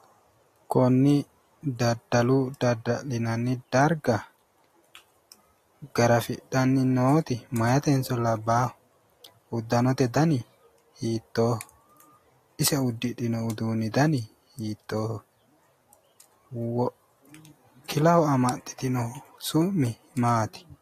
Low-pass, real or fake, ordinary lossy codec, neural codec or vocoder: 14.4 kHz; real; AAC, 48 kbps; none